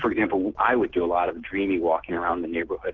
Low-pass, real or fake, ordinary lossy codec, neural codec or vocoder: 7.2 kHz; real; Opus, 16 kbps; none